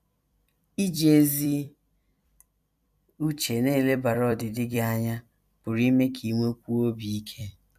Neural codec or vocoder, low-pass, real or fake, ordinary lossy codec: vocoder, 44.1 kHz, 128 mel bands every 256 samples, BigVGAN v2; 14.4 kHz; fake; none